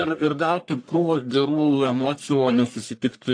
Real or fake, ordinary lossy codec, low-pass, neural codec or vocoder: fake; AAC, 48 kbps; 9.9 kHz; codec, 44.1 kHz, 1.7 kbps, Pupu-Codec